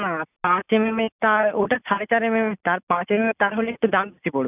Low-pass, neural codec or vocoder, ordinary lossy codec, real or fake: 3.6 kHz; none; none; real